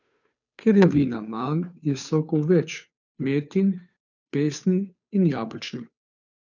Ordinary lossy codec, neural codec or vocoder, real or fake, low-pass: none; codec, 16 kHz, 2 kbps, FunCodec, trained on Chinese and English, 25 frames a second; fake; 7.2 kHz